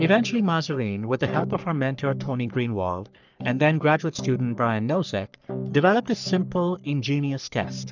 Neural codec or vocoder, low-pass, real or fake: codec, 44.1 kHz, 3.4 kbps, Pupu-Codec; 7.2 kHz; fake